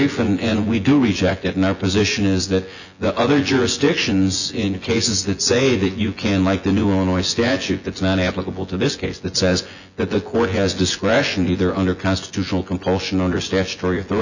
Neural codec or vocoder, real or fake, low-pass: vocoder, 24 kHz, 100 mel bands, Vocos; fake; 7.2 kHz